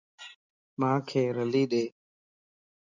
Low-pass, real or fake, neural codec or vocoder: 7.2 kHz; real; none